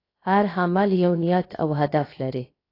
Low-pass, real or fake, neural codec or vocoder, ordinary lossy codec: 5.4 kHz; fake; codec, 16 kHz, 0.7 kbps, FocalCodec; AAC, 32 kbps